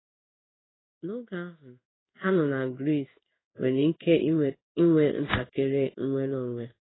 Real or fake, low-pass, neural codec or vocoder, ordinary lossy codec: fake; 7.2 kHz; codec, 16 kHz in and 24 kHz out, 1 kbps, XY-Tokenizer; AAC, 16 kbps